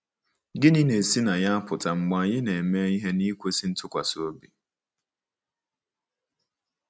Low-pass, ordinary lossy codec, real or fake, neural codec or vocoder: none; none; real; none